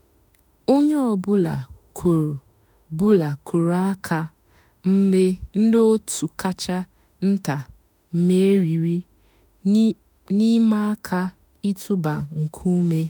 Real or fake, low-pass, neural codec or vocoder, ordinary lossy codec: fake; none; autoencoder, 48 kHz, 32 numbers a frame, DAC-VAE, trained on Japanese speech; none